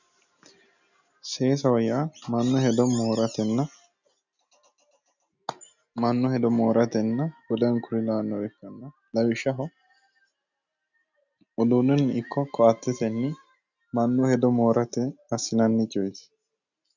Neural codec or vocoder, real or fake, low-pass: none; real; 7.2 kHz